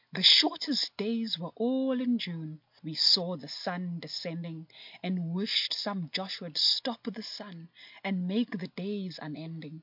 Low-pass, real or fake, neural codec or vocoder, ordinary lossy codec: 5.4 kHz; real; none; MP3, 48 kbps